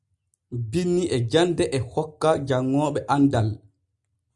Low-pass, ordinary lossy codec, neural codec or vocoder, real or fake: 10.8 kHz; Opus, 64 kbps; none; real